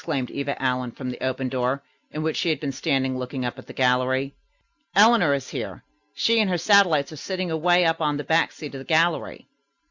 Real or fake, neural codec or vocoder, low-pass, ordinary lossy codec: real; none; 7.2 kHz; Opus, 64 kbps